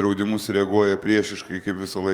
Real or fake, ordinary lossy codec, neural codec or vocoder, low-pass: fake; Opus, 64 kbps; codec, 44.1 kHz, 7.8 kbps, DAC; 19.8 kHz